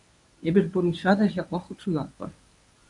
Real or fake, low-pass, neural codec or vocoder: fake; 10.8 kHz; codec, 24 kHz, 0.9 kbps, WavTokenizer, medium speech release version 1